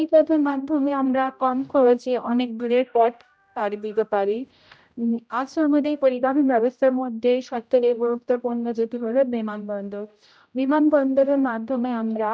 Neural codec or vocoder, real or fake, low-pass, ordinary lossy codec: codec, 16 kHz, 0.5 kbps, X-Codec, HuBERT features, trained on general audio; fake; none; none